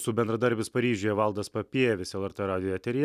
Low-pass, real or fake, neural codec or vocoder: 14.4 kHz; real; none